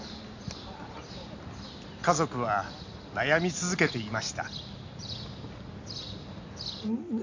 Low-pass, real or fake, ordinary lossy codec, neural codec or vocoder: 7.2 kHz; real; AAC, 48 kbps; none